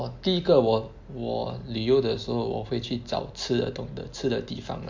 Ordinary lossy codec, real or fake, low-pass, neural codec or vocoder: MP3, 48 kbps; real; 7.2 kHz; none